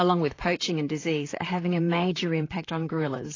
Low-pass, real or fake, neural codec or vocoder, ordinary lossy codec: 7.2 kHz; fake; vocoder, 44.1 kHz, 128 mel bands, Pupu-Vocoder; AAC, 32 kbps